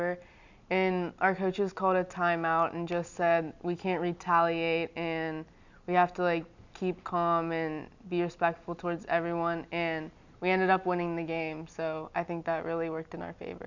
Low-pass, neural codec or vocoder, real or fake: 7.2 kHz; none; real